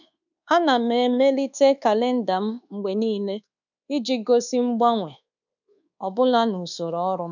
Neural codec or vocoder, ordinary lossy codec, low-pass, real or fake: codec, 24 kHz, 1.2 kbps, DualCodec; none; 7.2 kHz; fake